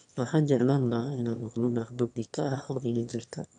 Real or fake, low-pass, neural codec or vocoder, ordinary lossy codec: fake; 9.9 kHz; autoencoder, 22.05 kHz, a latent of 192 numbers a frame, VITS, trained on one speaker; none